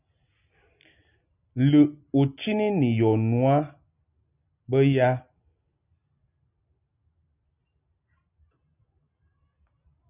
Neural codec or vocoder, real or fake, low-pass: none; real; 3.6 kHz